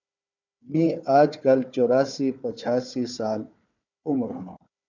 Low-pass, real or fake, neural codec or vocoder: 7.2 kHz; fake; codec, 16 kHz, 4 kbps, FunCodec, trained on Chinese and English, 50 frames a second